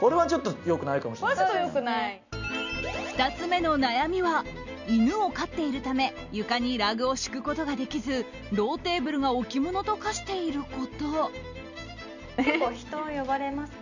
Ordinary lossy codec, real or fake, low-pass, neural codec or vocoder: none; real; 7.2 kHz; none